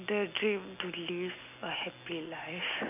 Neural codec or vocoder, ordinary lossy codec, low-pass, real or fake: none; none; 3.6 kHz; real